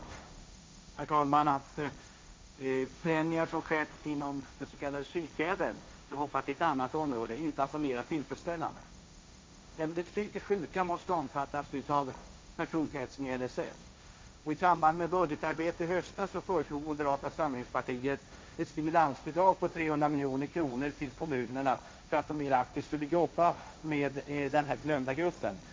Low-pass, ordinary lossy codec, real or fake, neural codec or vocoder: none; none; fake; codec, 16 kHz, 1.1 kbps, Voila-Tokenizer